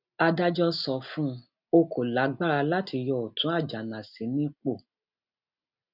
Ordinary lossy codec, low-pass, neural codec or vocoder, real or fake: none; 5.4 kHz; none; real